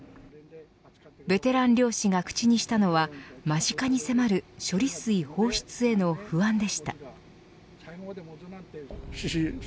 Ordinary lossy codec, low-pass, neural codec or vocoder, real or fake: none; none; none; real